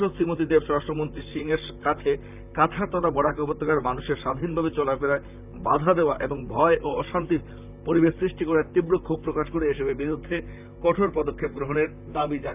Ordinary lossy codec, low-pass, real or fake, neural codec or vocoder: none; 3.6 kHz; fake; vocoder, 44.1 kHz, 128 mel bands, Pupu-Vocoder